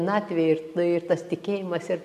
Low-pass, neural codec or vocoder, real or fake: 14.4 kHz; none; real